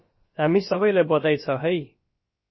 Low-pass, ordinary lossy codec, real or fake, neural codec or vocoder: 7.2 kHz; MP3, 24 kbps; fake; codec, 16 kHz, about 1 kbps, DyCAST, with the encoder's durations